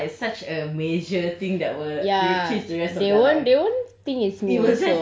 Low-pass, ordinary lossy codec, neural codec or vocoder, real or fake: none; none; none; real